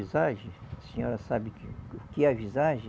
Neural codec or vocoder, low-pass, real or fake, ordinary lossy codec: none; none; real; none